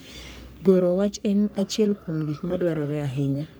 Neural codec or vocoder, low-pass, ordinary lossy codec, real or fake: codec, 44.1 kHz, 3.4 kbps, Pupu-Codec; none; none; fake